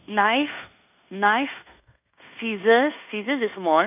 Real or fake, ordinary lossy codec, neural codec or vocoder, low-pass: fake; none; codec, 16 kHz in and 24 kHz out, 0.9 kbps, LongCat-Audio-Codec, fine tuned four codebook decoder; 3.6 kHz